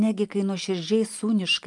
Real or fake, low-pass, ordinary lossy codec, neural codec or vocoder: real; 10.8 kHz; Opus, 32 kbps; none